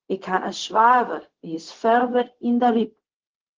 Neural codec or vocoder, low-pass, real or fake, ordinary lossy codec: codec, 16 kHz, 0.4 kbps, LongCat-Audio-Codec; 7.2 kHz; fake; Opus, 16 kbps